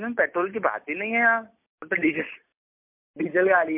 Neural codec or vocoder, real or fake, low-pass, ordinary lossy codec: none; real; 3.6 kHz; MP3, 32 kbps